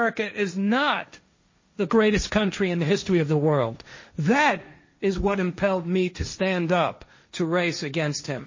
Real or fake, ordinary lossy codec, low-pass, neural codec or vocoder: fake; MP3, 32 kbps; 7.2 kHz; codec, 16 kHz, 1.1 kbps, Voila-Tokenizer